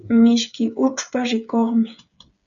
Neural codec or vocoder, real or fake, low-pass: codec, 16 kHz, 8 kbps, FreqCodec, smaller model; fake; 7.2 kHz